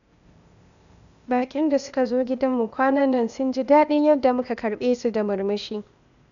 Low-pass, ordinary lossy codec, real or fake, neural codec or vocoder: 7.2 kHz; none; fake; codec, 16 kHz, 0.8 kbps, ZipCodec